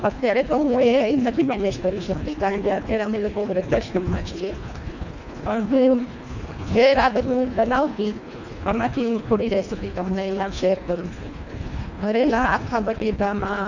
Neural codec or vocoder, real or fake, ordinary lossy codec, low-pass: codec, 24 kHz, 1.5 kbps, HILCodec; fake; none; 7.2 kHz